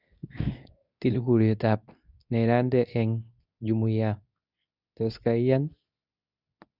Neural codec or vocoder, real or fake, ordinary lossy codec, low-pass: codec, 24 kHz, 0.9 kbps, WavTokenizer, medium speech release version 2; fake; none; 5.4 kHz